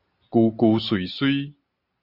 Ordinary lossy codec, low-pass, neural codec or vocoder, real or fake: MP3, 48 kbps; 5.4 kHz; none; real